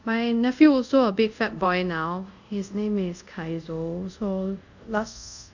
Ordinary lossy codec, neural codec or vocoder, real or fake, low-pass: none; codec, 24 kHz, 0.5 kbps, DualCodec; fake; 7.2 kHz